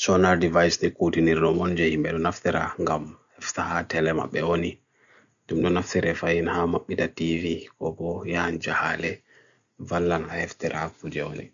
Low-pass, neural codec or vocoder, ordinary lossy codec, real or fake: 7.2 kHz; none; none; real